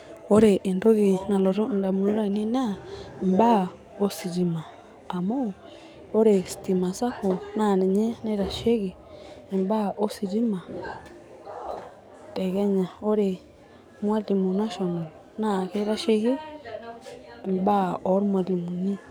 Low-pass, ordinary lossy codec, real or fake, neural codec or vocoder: none; none; fake; codec, 44.1 kHz, 7.8 kbps, DAC